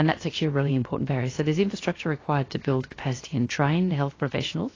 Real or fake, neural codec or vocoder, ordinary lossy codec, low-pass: fake; codec, 16 kHz, about 1 kbps, DyCAST, with the encoder's durations; AAC, 32 kbps; 7.2 kHz